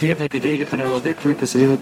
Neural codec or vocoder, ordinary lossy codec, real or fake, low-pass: codec, 44.1 kHz, 0.9 kbps, DAC; MP3, 64 kbps; fake; 19.8 kHz